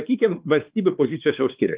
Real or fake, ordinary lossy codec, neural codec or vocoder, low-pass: fake; Opus, 32 kbps; codec, 16 kHz, 2 kbps, X-Codec, WavLM features, trained on Multilingual LibriSpeech; 3.6 kHz